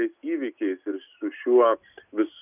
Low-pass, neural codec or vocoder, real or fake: 3.6 kHz; none; real